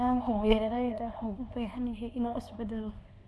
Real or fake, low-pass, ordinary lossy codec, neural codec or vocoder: fake; none; none; codec, 24 kHz, 0.9 kbps, WavTokenizer, small release